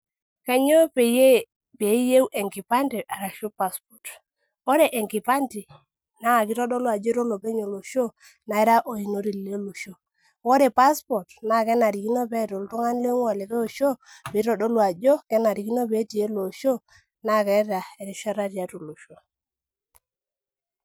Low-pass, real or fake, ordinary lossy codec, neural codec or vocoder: none; real; none; none